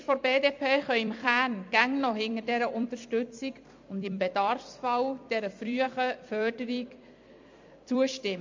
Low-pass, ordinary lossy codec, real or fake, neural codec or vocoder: 7.2 kHz; MP3, 48 kbps; fake; vocoder, 44.1 kHz, 128 mel bands every 256 samples, BigVGAN v2